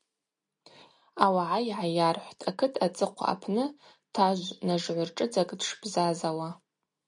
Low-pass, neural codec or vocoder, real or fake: 10.8 kHz; none; real